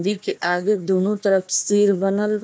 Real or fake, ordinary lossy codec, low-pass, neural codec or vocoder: fake; none; none; codec, 16 kHz, 1 kbps, FunCodec, trained on Chinese and English, 50 frames a second